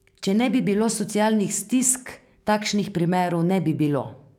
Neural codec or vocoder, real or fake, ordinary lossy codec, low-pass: codec, 44.1 kHz, 7.8 kbps, DAC; fake; none; 19.8 kHz